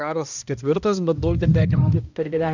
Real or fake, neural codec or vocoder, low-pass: fake; codec, 16 kHz, 1 kbps, X-Codec, HuBERT features, trained on balanced general audio; 7.2 kHz